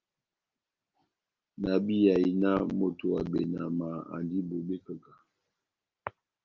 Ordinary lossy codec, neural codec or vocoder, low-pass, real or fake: Opus, 32 kbps; none; 7.2 kHz; real